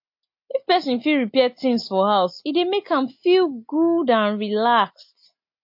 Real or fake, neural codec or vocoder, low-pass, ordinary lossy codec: real; none; 5.4 kHz; MP3, 32 kbps